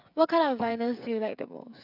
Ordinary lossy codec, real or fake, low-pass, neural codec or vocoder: none; fake; 5.4 kHz; codec, 16 kHz, 16 kbps, FreqCodec, smaller model